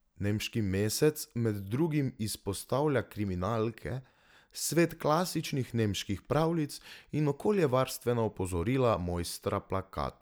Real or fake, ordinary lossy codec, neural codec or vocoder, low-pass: real; none; none; none